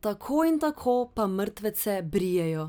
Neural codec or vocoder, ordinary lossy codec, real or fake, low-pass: none; none; real; none